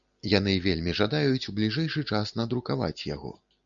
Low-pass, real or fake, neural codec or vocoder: 7.2 kHz; real; none